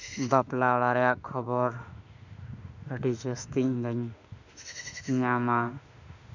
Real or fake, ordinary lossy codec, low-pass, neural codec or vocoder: fake; none; 7.2 kHz; autoencoder, 48 kHz, 32 numbers a frame, DAC-VAE, trained on Japanese speech